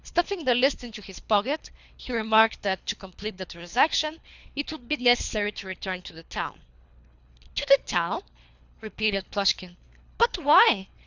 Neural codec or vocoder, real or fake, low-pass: codec, 24 kHz, 3 kbps, HILCodec; fake; 7.2 kHz